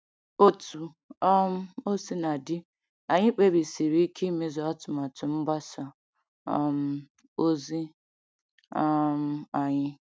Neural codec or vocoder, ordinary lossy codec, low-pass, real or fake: none; none; none; real